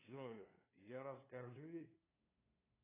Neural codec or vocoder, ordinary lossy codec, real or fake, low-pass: codec, 16 kHz, 2 kbps, FunCodec, trained on LibriTTS, 25 frames a second; AAC, 24 kbps; fake; 3.6 kHz